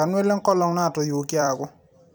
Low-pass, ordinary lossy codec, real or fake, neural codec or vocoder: none; none; fake; vocoder, 44.1 kHz, 128 mel bands every 256 samples, BigVGAN v2